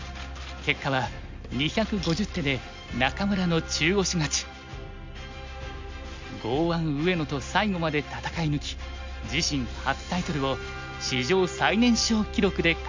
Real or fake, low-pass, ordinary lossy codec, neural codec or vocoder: real; 7.2 kHz; MP3, 48 kbps; none